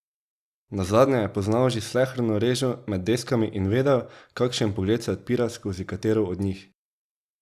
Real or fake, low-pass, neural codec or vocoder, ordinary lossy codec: real; 14.4 kHz; none; Opus, 64 kbps